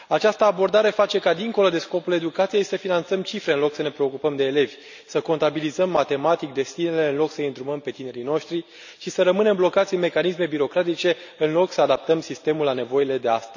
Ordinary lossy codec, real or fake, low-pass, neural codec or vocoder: none; real; 7.2 kHz; none